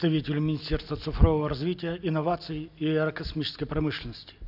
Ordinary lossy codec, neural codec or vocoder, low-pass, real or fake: none; none; 5.4 kHz; real